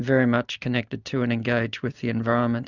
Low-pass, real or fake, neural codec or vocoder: 7.2 kHz; real; none